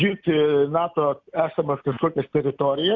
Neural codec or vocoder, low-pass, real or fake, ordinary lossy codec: none; 7.2 kHz; real; Opus, 64 kbps